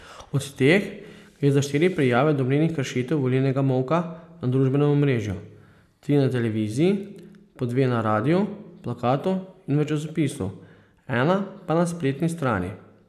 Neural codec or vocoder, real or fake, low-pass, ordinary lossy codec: none; real; 14.4 kHz; none